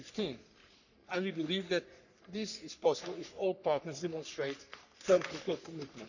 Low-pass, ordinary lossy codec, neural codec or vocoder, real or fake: 7.2 kHz; none; codec, 44.1 kHz, 3.4 kbps, Pupu-Codec; fake